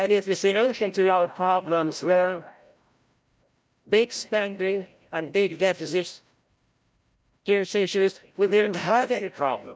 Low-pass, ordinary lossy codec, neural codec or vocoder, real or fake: none; none; codec, 16 kHz, 0.5 kbps, FreqCodec, larger model; fake